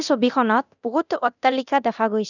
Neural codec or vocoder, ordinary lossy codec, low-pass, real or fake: codec, 24 kHz, 0.9 kbps, DualCodec; none; 7.2 kHz; fake